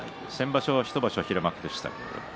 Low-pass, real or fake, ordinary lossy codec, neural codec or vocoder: none; real; none; none